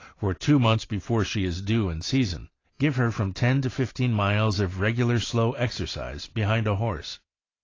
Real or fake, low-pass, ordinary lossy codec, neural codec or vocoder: real; 7.2 kHz; AAC, 32 kbps; none